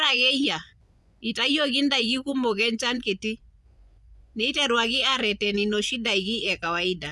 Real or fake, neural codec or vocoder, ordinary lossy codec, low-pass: fake; vocoder, 24 kHz, 100 mel bands, Vocos; none; none